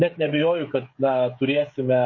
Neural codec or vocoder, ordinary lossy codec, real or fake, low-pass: codec, 16 kHz, 16 kbps, FreqCodec, smaller model; MP3, 32 kbps; fake; 7.2 kHz